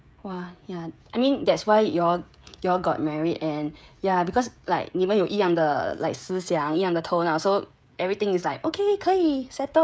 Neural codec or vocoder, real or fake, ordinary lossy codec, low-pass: codec, 16 kHz, 16 kbps, FreqCodec, smaller model; fake; none; none